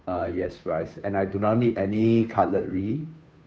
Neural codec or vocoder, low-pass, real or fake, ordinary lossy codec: codec, 16 kHz, 2 kbps, FunCodec, trained on Chinese and English, 25 frames a second; none; fake; none